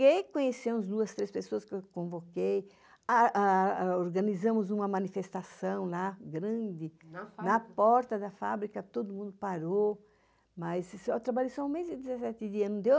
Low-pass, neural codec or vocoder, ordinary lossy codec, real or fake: none; none; none; real